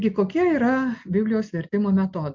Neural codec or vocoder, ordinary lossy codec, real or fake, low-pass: none; MP3, 64 kbps; real; 7.2 kHz